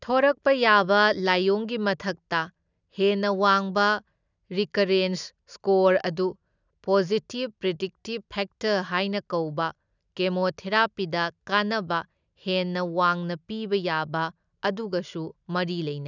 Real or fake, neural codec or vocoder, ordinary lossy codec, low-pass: real; none; none; 7.2 kHz